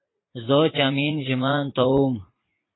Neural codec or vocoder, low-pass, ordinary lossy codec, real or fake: vocoder, 44.1 kHz, 80 mel bands, Vocos; 7.2 kHz; AAC, 16 kbps; fake